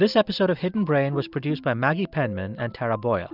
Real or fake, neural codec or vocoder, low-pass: real; none; 5.4 kHz